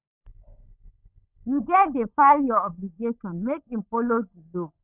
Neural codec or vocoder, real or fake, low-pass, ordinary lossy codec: codec, 16 kHz, 16 kbps, FunCodec, trained on LibriTTS, 50 frames a second; fake; 3.6 kHz; none